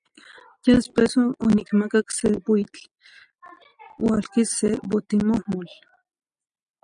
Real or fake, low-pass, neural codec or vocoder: real; 9.9 kHz; none